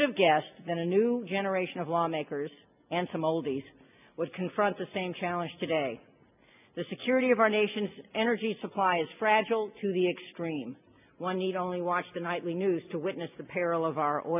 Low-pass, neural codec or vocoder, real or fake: 3.6 kHz; none; real